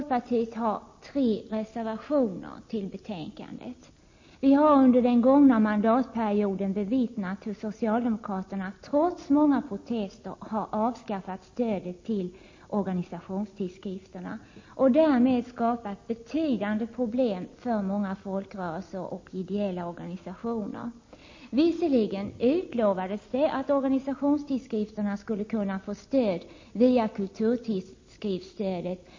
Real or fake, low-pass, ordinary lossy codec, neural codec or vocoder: real; 7.2 kHz; MP3, 32 kbps; none